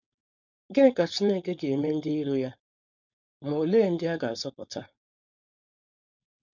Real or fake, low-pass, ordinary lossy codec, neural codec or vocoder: fake; 7.2 kHz; none; codec, 16 kHz, 4.8 kbps, FACodec